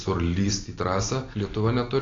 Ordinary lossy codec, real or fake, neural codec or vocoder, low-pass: AAC, 32 kbps; real; none; 7.2 kHz